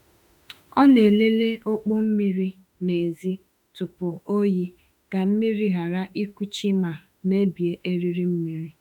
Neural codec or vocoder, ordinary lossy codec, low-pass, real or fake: autoencoder, 48 kHz, 32 numbers a frame, DAC-VAE, trained on Japanese speech; none; 19.8 kHz; fake